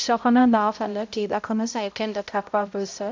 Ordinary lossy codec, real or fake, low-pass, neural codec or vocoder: MP3, 64 kbps; fake; 7.2 kHz; codec, 16 kHz, 0.5 kbps, X-Codec, HuBERT features, trained on balanced general audio